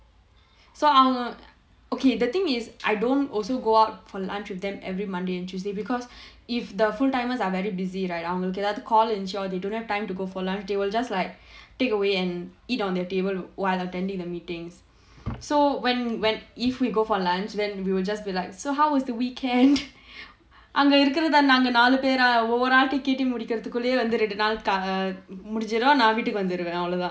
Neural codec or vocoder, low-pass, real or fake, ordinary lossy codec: none; none; real; none